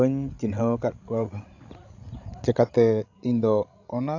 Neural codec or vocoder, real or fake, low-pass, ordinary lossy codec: codec, 16 kHz, 16 kbps, FreqCodec, larger model; fake; 7.2 kHz; AAC, 48 kbps